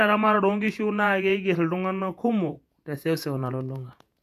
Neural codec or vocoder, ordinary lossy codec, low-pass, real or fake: vocoder, 48 kHz, 128 mel bands, Vocos; MP3, 96 kbps; 14.4 kHz; fake